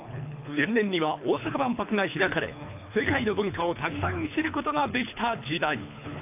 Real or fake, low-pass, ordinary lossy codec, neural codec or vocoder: fake; 3.6 kHz; AAC, 32 kbps; codec, 24 kHz, 3 kbps, HILCodec